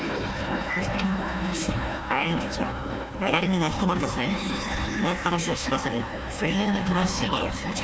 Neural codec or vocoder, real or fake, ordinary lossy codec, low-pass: codec, 16 kHz, 1 kbps, FunCodec, trained on Chinese and English, 50 frames a second; fake; none; none